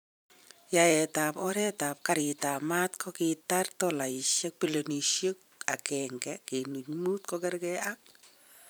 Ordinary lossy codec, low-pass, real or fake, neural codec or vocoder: none; none; fake; vocoder, 44.1 kHz, 128 mel bands every 512 samples, BigVGAN v2